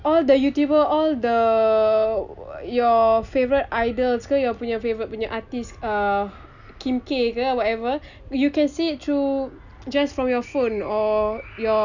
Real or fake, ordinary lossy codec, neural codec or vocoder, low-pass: real; none; none; 7.2 kHz